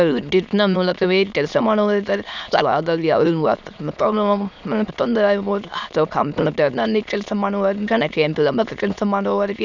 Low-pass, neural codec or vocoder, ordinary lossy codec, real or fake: 7.2 kHz; autoencoder, 22.05 kHz, a latent of 192 numbers a frame, VITS, trained on many speakers; none; fake